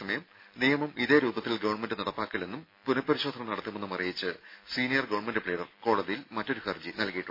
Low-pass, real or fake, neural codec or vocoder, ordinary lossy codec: 5.4 kHz; real; none; MP3, 24 kbps